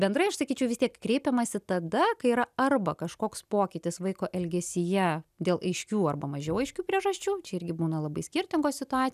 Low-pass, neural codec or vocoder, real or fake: 14.4 kHz; none; real